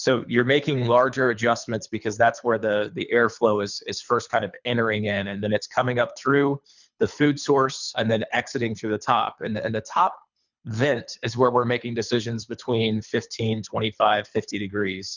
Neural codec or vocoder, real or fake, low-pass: codec, 24 kHz, 3 kbps, HILCodec; fake; 7.2 kHz